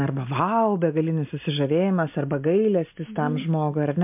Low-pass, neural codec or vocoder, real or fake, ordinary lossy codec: 3.6 kHz; none; real; MP3, 32 kbps